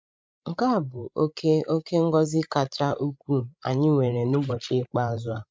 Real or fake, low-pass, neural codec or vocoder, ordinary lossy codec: fake; none; codec, 16 kHz, 16 kbps, FreqCodec, larger model; none